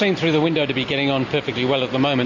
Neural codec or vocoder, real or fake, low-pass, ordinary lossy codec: none; real; 7.2 kHz; MP3, 48 kbps